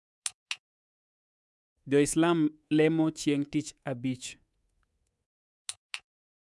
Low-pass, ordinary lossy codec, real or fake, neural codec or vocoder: none; none; fake; codec, 24 kHz, 3.1 kbps, DualCodec